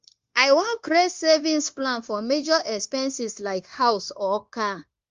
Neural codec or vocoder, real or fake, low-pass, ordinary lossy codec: codec, 16 kHz, 0.9 kbps, LongCat-Audio-Codec; fake; 7.2 kHz; Opus, 32 kbps